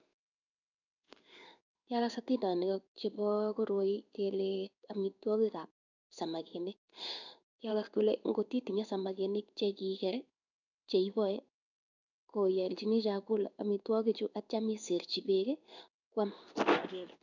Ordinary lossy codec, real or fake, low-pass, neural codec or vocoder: none; fake; 7.2 kHz; codec, 16 kHz in and 24 kHz out, 1 kbps, XY-Tokenizer